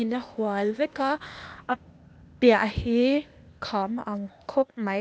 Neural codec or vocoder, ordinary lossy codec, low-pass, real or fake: codec, 16 kHz, 0.8 kbps, ZipCodec; none; none; fake